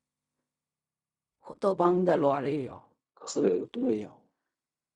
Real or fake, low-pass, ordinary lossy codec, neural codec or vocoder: fake; 10.8 kHz; Opus, 64 kbps; codec, 16 kHz in and 24 kHz out, 0.4 kbps, LongCat-Audio-Codec, fine tuned four codebook decoder